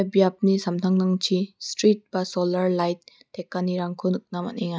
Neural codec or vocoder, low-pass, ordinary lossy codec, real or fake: none; none; none; real